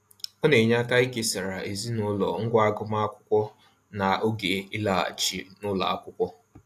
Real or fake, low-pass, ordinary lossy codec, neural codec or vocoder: real; 14.4 kHz; AAC, 64 kbps; none